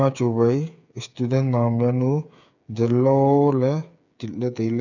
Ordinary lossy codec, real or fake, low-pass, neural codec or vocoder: none; fake; 7.2 kHz; codec, 16 kHz, 8 kbps, FreqCodec, smaller model